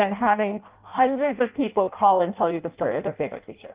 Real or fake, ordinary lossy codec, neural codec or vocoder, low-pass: fake; Opus, 32 kbps; codec, 16 kHz in and 24 kHz out, 0.6 kbps, FireRedTTS-2 codec; 3.6 kHz